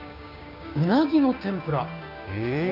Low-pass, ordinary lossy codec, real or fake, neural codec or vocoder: 5.4 kHz; none; fake; codec, 16 kHz, 6 kbps, DAC